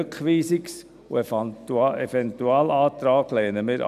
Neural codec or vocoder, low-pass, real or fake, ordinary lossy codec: none; 14.4 kHz; real; none